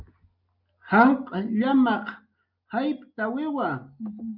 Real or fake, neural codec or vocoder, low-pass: real; none; 5.4 kHz